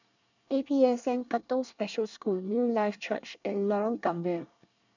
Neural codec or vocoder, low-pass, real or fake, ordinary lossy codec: codec, 24 kHz, 1 kbps, SNAC; 7.2 kHz; fake; none